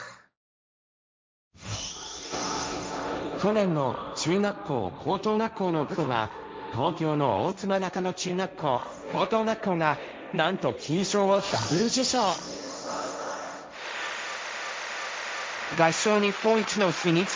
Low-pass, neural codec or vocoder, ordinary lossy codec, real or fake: none; codec, 16 kHz, 1.1 kbps, Voila-Tokenizer; none; fake